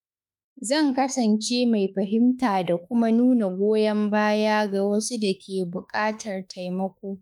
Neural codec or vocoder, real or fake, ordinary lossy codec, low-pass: autoencoder, 48 kHz, 32 numbers a frame, DAC-VAE, trained on Japanese speech; fake; none; 19.8 kHz